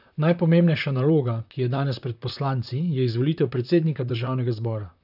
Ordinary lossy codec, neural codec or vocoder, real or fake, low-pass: none; vocoder, 44.1 kHz, 128 mel bands, Pupu-Vocoder; fake; 5.4 kHz